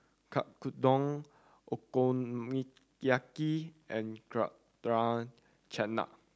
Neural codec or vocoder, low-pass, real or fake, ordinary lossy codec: none; none; real; none